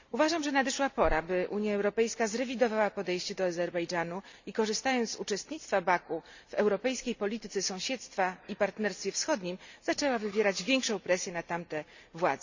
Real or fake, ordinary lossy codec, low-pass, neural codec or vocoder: real; Opus, 64 kbps; 7.2 kHz; none